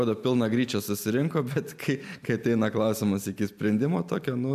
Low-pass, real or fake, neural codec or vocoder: 14.4 kHz; real; none